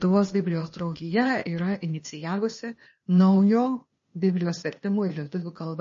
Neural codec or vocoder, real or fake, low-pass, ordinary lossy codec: codec, 16 kHz, 0.8 kbps, ZipCodec; fake; 7.2 kHz; MP3, 32 kbps